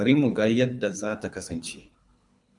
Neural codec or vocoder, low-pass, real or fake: codec, 24 kHz, 3 kbps, HILCodec; 10.8 kHz; fake